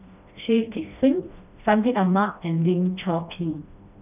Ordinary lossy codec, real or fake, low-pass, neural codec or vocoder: none; fake; 3.6 kHz; codec, 16 kHz, 1 kbps, FreqCodec, smaller model